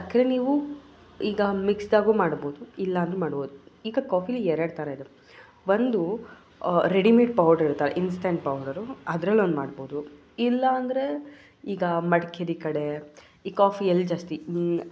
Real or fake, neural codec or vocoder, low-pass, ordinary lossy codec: real; none; none; none